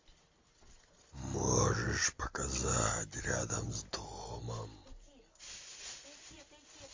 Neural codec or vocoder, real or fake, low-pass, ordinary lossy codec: none; real; 7.2 kHz; MP3, 48 kbps